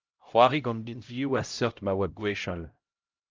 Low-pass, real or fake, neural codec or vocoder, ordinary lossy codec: 7.2 kHz; fake; codec, 16 kHz, 0.5 kbps, X-Codec, HuBERT features, trained on LibriSpeech; Opus, 24 kbps